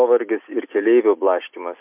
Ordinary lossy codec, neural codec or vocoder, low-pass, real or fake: MP3, 32 kbps; none; 3.6 kHz; real